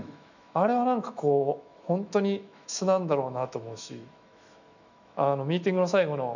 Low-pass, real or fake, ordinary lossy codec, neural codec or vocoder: 7.2 kHz; fake; none; autoencoder, 48 kHz, 128 numbers a frame, DAC-VAE, trained on Japanese speech